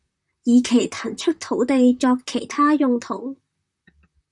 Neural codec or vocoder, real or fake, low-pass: vocoder, 44.1 kHz, 128 mel bands, Pupu-Vocoder; fake; 10.8 kHz